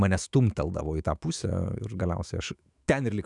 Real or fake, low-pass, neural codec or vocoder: fake; 10.8 kHz; autoencoder, 48 kHz, 128 numbers a frame, DAC-VAE, trained on Japanese speech